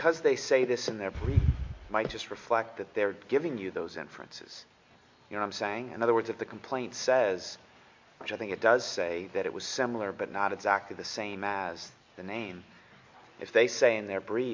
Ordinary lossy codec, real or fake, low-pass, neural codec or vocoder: MP3, 48 kbps; real; 7.2 kHz; none